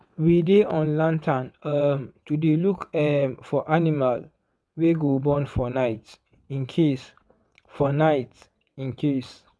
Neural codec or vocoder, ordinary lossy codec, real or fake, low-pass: vocoder, 22.05 kHz, 80 mel bands, WaveNeXt; none; fake; none